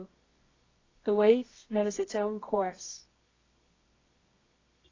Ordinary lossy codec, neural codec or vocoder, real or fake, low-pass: AAC, 32 kbps; codec, 24 kHz, 0.9 kbps, WavTokenizer, medium music audio release; fake; 7.2 kHz